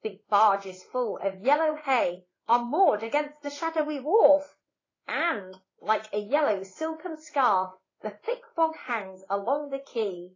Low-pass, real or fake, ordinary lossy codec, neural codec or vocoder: 7.2 kHz; fake; AAC, 32 kbps; codec, 16 kHz in and 24 kHz out, 1 kbps, XY-Tokenizer